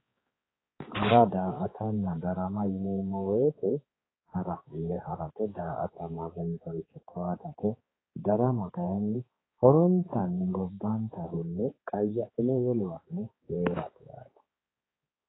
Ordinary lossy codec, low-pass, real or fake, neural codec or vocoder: AAC, 16 kbps; 7.2 kHz; fake; codec, 16 kHz, 4 kbps, X-Codec, HuBERT features, trained on balanced general audio